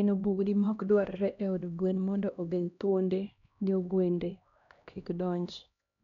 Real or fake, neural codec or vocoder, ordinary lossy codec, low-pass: fake; codec, 16 kHz, 1 kbps, X-Codec, HuBERT features, trained on LibriSpeech; none; 7.2 kHz